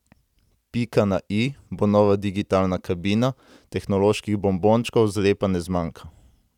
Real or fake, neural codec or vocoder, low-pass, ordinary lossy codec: real; none; 19.8 kHz; none